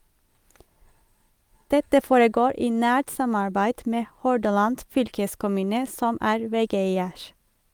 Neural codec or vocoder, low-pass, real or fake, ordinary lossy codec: none; 19.8 kHz; real; Opus, 32 kbps